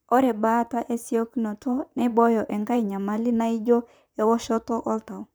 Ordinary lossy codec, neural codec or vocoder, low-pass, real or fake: none; vocoder, 44.1 kHz, 128 mel bands, Pupu-Vocoder; none; fake